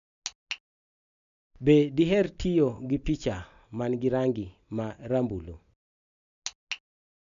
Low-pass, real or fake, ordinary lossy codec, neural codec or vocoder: 7.2 kHz; real; none; none